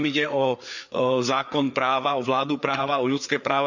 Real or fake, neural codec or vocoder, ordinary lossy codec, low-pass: fake; codec, 16 kHz, 4 kbps, FreqCodec, larger model; none; 7.2 kHz